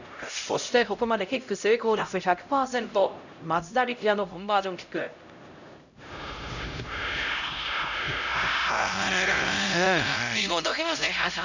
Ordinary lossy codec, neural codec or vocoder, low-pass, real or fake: none; codec, 16 kHz, 0.5 kbps, X-Codec, HuBERT features, trained on LibriSpeech; 7.2 kHz; fake